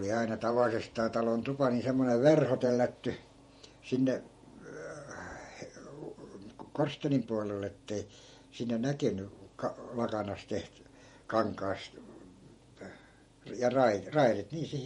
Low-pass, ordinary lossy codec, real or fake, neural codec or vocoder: 19.8 kHz; MP3, 48 kbps; fake; vocoder, 48 kHz, 128 mel bands, Vocos